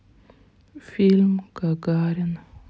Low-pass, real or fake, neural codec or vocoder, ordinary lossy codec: none; real; none; none